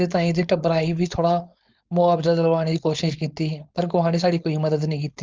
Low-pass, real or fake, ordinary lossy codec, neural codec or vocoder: 7.2 kHz; fake; Opus, 32 kbps; codec, 16 kHz, 4.8 kbps, FACodec